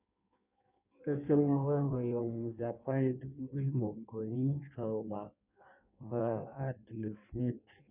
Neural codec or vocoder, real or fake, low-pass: codec, 16 kHz in and 24 kHz out, 1.1 kbps, FireRedTTS-2 codec; fake; 3.6 kHz